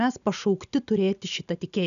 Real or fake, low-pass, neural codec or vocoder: real; 7.2 kHz; none